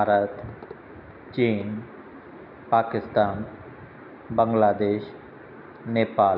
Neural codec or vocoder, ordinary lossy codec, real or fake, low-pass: none; none; real; 5.4 kHz